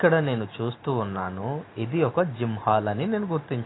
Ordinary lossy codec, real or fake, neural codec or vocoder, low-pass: AAC, 16 kbps; real; none; 7.2 kHz